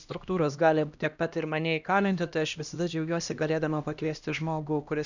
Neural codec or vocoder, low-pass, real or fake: codec, 16 kHz, 1 kbps, X-Codec, HuBERT features, trained on LibriSpeech; 7.2 kHz; fake